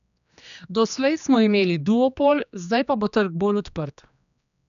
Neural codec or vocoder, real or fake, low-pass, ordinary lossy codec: codec, 16 kHz, 2 kbps, X-Codec, HuBERT features, trained on general audio; fake; 7.2 kHz; none